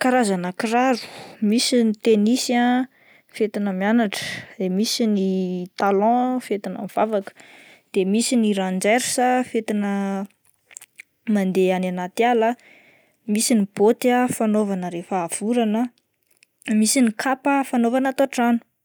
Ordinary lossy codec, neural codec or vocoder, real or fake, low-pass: none; none; real; none